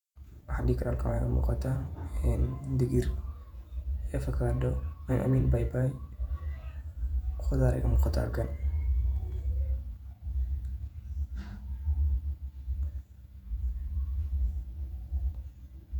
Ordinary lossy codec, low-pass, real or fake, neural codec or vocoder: none; 19.8 kHz; fake; vocoder, 48 kHz, 128 mel bands, Vocos